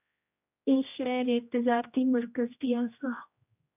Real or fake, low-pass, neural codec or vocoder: fake; 3.6 kHz; codec, 16 kHz, 1 kbps, X-Codec, HuBERT features, trained on general audio